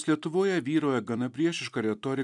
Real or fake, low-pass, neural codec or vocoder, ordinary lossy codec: real; 10.8 kHz; none; MP3, 96 kbps